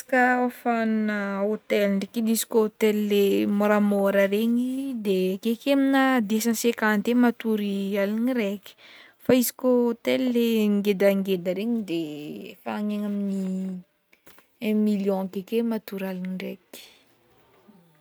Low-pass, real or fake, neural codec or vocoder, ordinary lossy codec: none; real; none; none